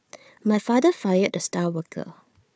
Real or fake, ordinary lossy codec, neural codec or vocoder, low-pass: fake; none; codec, 16 kHz, 4 kbps, FunCodec, trained on Chinese and English, 50 frames a second; none